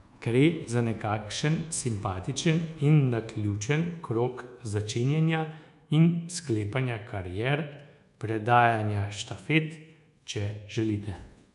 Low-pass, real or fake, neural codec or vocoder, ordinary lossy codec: 10.8 kHz; fake; codec, 24 kHz, 1.2 kbps, DualCodec; none